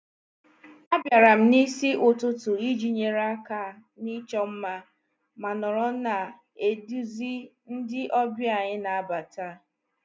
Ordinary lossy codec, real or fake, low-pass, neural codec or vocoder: none; real; none; none